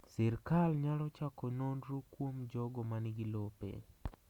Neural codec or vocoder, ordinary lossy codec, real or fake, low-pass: none; Opus, 64 kbps; real; 19.8 kHz